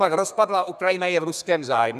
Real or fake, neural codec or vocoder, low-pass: fake; codec, 32 kHz, 1.9 kbps, SNAC; 14.4 kHz